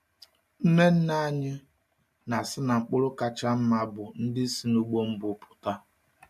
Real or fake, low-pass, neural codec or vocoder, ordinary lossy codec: real; 14.4 kHz; none; MP3, 64 kbps